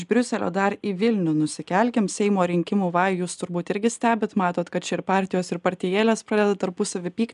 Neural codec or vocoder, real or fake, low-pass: none; real; 10.8 kHz